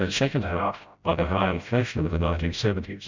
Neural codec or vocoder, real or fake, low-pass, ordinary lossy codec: codec, 16 kHz, 0.5 kbps, FreqCodec, smaller model; fake; 7.2 kHz; MP3, 64 kbps